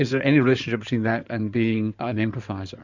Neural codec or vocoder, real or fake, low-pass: codec, 16 kHz in and 24 kHz out, 2.2 kbps, FireRedTTS-2 codec; fake; 7.2 kHz